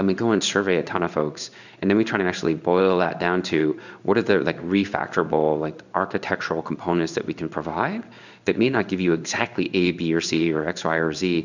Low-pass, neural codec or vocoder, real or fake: 7.2 kHz; codec, 16 kHz in and 24 kHz out, 1 kbps, XY-Tokenizer; fake